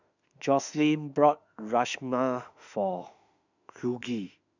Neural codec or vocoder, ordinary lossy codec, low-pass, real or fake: autoencoder, 48 kHz, 32 numbers a frame, DAC-VAE, trained on Japanese speech; none; 7.2 kHz; fake